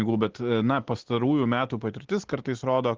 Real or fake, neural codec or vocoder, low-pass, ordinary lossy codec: real; none; 7.2 kHz; Opus, 16 kbps